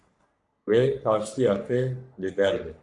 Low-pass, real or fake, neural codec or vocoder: 10.8 kHz; fake; codec, 44.1 kHz, 3.4 kbps, Pupu-Codec